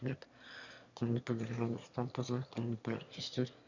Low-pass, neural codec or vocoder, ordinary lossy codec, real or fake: 7.2 kHz; autoencoder, 22.05 kHz, a latent of 192 numbers a frame, VITS, trained on one speaker; Opus, 64 kbps; fake